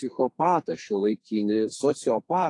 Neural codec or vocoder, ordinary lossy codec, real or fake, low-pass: codec, 32 kHz, 1.9 kbps, SNAC; AAC, 48 kbps; fake; 10.8 kHz